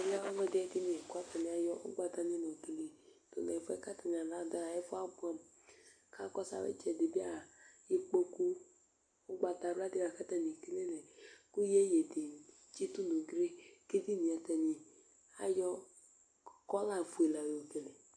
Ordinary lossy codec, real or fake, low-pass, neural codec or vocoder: AAC, 64 kbps; real; 9.9 kHz; none